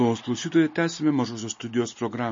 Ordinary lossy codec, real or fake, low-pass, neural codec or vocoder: MP3, 32 kbps; real; 7.2 kHz; none